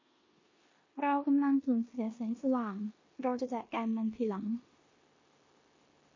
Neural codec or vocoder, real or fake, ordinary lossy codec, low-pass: codec, 16 kHz in and 24 kHz out, 0.9 kbps, LongCat-Audio-Codec, four codebook decoder; fake; MP3, 32 kbps; 7.2 kHz